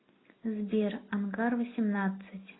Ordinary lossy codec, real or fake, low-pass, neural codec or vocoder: AAC, 16 kbps; real; 7.2 kHz; none